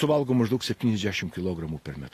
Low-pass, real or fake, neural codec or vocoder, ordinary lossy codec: 14.4 kHz; real; none; AAC, 48 kbps